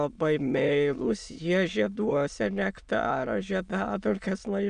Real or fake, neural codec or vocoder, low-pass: fake; autoencoder, 22.05 kHz, a latent of 192 numbers a frame, VITS, trained on many speakers; 9.9 kHz